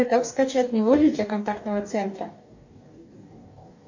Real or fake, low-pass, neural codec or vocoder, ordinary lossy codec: fake; 7.2 kHz; codec, 44.1 kHz, 2.6 kbps, DAC; AAC, 48 kbps